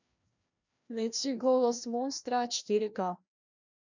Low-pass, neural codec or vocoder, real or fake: 7.2 kHz; codec, 16 kHz, 1 kbps, FreqCodec, larger model; fake